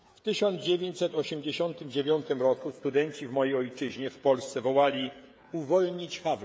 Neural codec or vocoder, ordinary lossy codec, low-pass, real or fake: codec, 16 kHz, 16 kbps, FreqCodec, smaller model; none; none; fake